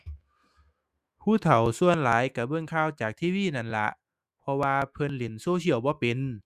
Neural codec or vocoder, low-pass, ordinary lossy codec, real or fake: autoencoder, 48 kHz, 128 numbers a frame, DAC-VAE, trained on Japanese speech; 14.4 kHz; Opus, 64 kbps; fake